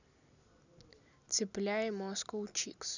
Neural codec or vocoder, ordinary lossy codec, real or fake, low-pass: none; none; real; 7.2 kHz